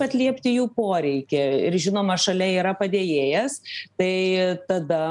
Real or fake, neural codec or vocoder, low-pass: real; none; 10.8 kHz